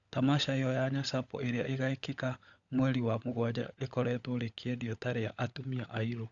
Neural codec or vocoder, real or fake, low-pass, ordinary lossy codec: codec, 16 kHz, 16 kbps, FunCodec, trained on LibriTTS, 50 frames a second; fake; 7.2 kHz; Opus, 64 kbps